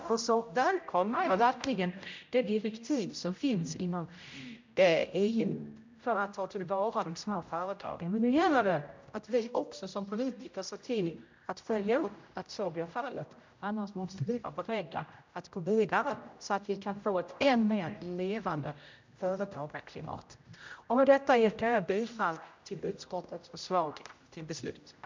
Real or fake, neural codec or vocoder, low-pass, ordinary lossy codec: fake; codec, 16 kHz, 0.5 kbps, X-Codec, HuBERT features, trained on general audio; 7.2 kHz; MP3, 64 kbps